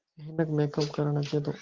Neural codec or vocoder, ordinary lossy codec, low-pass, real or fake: none; Opus, 16 kbps; 7.2 kHz; real